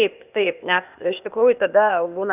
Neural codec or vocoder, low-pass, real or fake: codec, 16 kHz, 0.8 kbps, ZipCodec; 3.6 kHz; fake